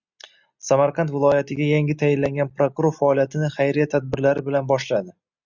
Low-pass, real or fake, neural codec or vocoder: 7.2 kHz; real; none